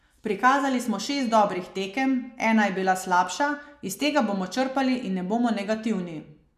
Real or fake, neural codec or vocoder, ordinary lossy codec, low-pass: real; none; MP3, 96 kbps; 14.4 kHz